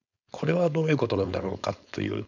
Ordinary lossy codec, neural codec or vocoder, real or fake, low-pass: none; codec, 16 kHz, 4.8 kbps, FACodec; fake; 7.2 kHz